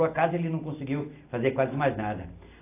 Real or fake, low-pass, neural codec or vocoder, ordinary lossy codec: real; 3.6 kHz; none; AAC, 24 kbps